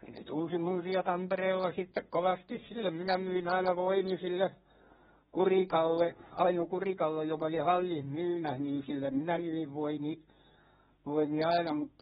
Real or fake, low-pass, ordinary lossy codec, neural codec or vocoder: fake; 14.4 kHz; AAC, 16 kbps; codec, 32 kHz, 1.9 kbps, SNAC